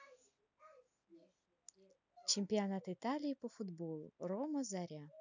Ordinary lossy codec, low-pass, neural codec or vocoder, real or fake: none; 7.2 kHz; none; real